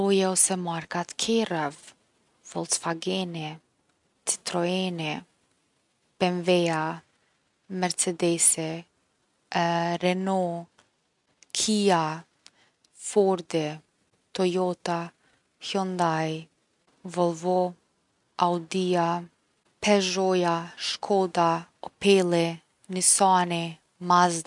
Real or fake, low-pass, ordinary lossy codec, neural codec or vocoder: real; 10.8 kHz; none; none